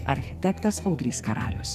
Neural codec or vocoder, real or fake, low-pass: codec, 44.1 kHz, 2.6 kbps, SNAC; fake; 14.4 kHz